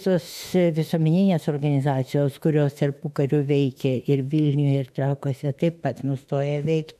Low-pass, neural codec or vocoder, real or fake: 14.4 kHz; autoencoder, 48 kHz, 32 numbers a frame, DAC-VAE, trained on Japanese speech; fake